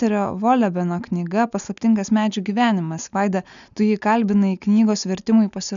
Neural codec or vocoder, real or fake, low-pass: none; real; 7.2 kHz